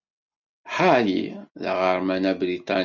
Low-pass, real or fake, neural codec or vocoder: 7.2 kHz; real; none